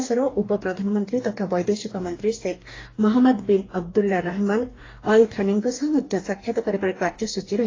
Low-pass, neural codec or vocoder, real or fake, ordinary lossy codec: 7.2 kHz; codec, 44.1 kHz, 2.6 kbps, DAC; fake; AAC, 32 kbps